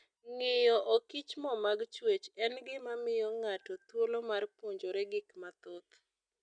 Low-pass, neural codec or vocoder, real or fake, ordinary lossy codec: 9.9 kHz; none; real; none